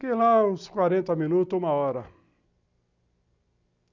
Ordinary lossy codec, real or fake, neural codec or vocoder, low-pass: none; real; none; 7.2 kHz